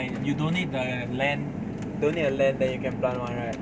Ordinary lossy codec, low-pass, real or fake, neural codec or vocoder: none; none; real; none